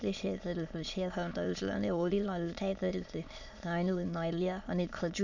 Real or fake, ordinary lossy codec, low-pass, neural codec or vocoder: fake; none; 7.2 kHz; autoencoder, 22.05 kHz, a latent of 192 numbers a frame, VITS, trained on many speakers